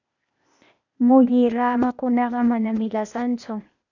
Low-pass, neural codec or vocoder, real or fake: 7.2 kHz; codec, 16 kHz, 0.8 kbps, ZipCodec; fake